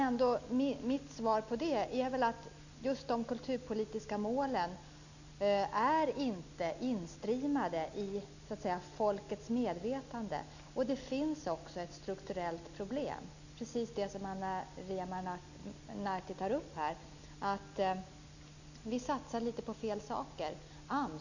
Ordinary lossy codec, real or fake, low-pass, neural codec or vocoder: none; real; 7.2 kHz; none